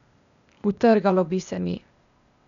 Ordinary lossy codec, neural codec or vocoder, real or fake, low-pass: none; codec, 16 kHz, 0.8 kbps, ZipCodec; fake; 7.2 kHz